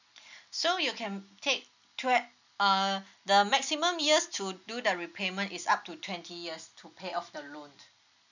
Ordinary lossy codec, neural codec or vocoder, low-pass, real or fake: none; none; 7.2 kHz; real